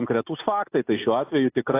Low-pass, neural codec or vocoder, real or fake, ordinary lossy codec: 3.6 kHz; none; real; AAC, 24 kbps